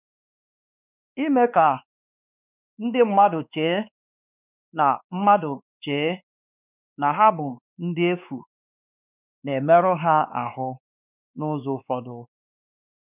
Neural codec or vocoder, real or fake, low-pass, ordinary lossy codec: codec, 16 kHz, 2 kbps, X-Codec, WavLM features, trained on Multilingual LibriSpeech; fake; 3.6 kHz; none